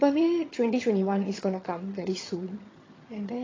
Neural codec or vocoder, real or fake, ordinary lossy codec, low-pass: vocoder, 22.05 kHz, 80 mel bands, HiFi-GAN; fake; AAC, 32 kbps; 7.2 kHz